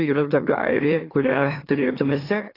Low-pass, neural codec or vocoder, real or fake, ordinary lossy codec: 5.4 kHz; autoencoder, 44.1 kHz, a latent of 192 numbers a frame, MeloTTS; fake; AAC, 24 kbps